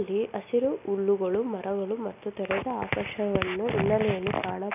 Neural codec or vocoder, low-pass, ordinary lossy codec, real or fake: none; 3.6 kHz; none; real